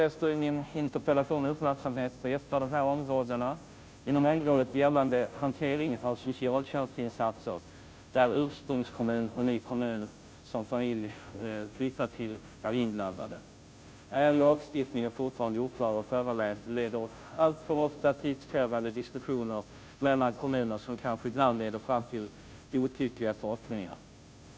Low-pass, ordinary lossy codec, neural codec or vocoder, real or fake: none; none; codec, 16 kHz, 0.5 kbps, FunCodec, trained on Chinese and English, 25 frames a second; fake